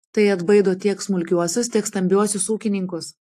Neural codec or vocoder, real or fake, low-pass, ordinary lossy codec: none; real; 14.4 kHz; AAC, 64 kbps